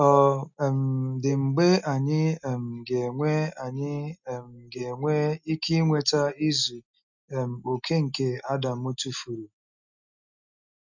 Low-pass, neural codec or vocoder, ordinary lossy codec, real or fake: 7.2 kHz; none; none; real